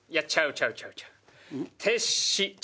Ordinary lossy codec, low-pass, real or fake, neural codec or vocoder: none; none; real; none